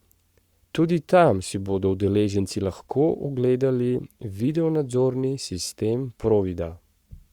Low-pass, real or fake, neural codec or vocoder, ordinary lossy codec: 19.8 kHz; fake; codec, 44.1 kHz, 7.8 kbps, Pupu-Codec; Opus, 64 kbps